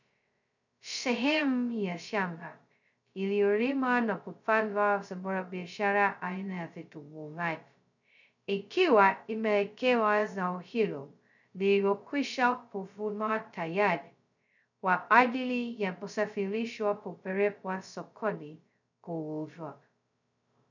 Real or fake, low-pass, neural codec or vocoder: fake; 7.2 kHz; codec, 16 kHz, 0.2 kbps, FocalCodec